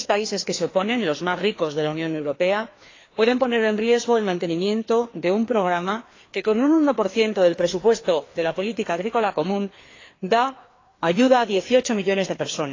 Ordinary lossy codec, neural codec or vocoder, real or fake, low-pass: AAC, 32 kbps; codec, 16 kHz, 2 kbps, FreqCodec, larger model; fake; 7.2 kHz